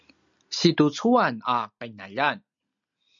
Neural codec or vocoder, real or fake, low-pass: none; real; 7.2 kHz